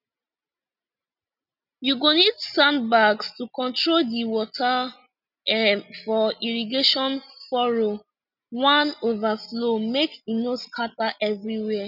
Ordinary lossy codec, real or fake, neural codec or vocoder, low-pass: none; real; none; 5.4 kHz